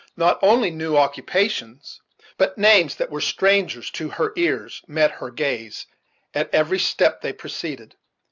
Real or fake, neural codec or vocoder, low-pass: real; none; 7.2 kHz